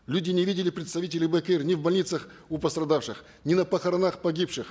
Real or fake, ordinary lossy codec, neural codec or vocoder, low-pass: real; none; none; none